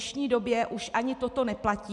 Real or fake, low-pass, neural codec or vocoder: real; 10.8 kHz; none